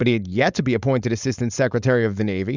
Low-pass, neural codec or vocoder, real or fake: 7.2 kHz; codec, 16 kHz, 4.8 kbps, FACodec; fake